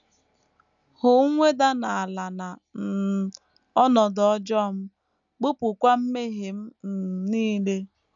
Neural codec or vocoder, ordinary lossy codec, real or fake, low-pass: none; none; real; 7.2 kHz